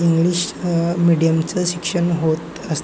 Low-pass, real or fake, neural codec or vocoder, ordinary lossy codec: none; real; none; none